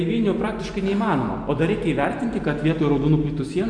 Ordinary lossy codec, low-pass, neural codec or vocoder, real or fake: MP3, 96 kbps; 9.9 kHz; none; real